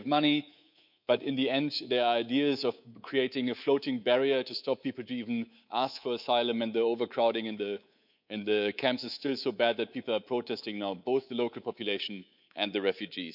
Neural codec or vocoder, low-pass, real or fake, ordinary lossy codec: codec, 24 kHz, 3.1 kbps, DualCodec; 5.4 kHz; fake; none